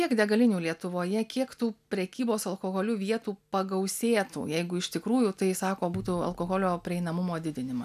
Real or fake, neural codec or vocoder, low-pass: real; none; 14.4 kHz